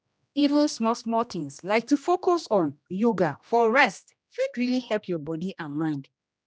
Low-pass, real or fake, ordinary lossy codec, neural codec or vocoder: none; fake; none; codec, 16 kHz, 1 kbps, X-Codec, HuBERT features, trained on general audio